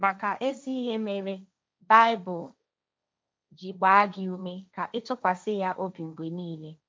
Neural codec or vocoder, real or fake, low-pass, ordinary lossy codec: codec, 16 kHz, 1.1 kbps, Voila-Tokenizer; fake; none; none